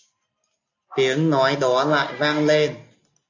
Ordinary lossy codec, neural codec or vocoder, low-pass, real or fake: AAC, 48 kbps; none; 7.2 kHz; real